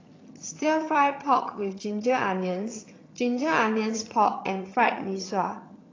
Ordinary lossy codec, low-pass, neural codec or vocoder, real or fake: AAC, 32 kbps; 7.2 kHz; vocoder, 22.05 kHz, 80 mel bands, HiFi-GAN; fake